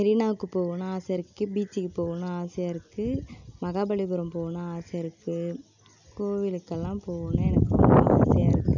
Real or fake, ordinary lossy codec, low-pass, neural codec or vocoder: real; none; 7.2 kHz; none